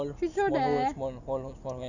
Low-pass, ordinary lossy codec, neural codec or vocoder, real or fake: 7.2 kHz; none; none; real